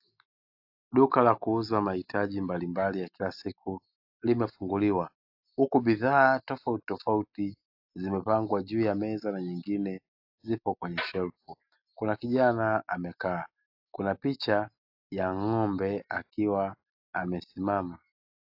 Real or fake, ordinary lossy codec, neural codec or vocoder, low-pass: real; AAC, 48 kbps; none; 5.4 kHz